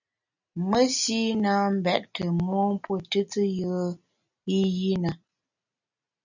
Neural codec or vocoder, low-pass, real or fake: none; 7.2 kHz; real